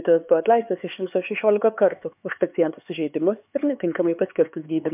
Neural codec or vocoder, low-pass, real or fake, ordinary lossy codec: codec, 16 kHz, 4 kbps, X-Codec, HuBERT features, trained on LibriSpeech; 3.6 kHz; fake; MP3, 32 kbps